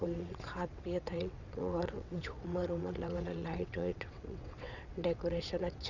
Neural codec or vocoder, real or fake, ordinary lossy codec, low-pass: vocoder, 22.05 kHz, 80 mel bands, WaveNeXt; fake; none; 7.2 kHz